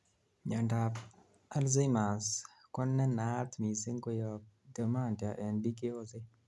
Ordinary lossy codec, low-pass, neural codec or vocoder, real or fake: none; none; none; real